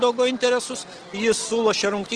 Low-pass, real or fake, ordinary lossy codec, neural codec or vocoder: 9.9 kHz; real; Opus, 16 kbps; none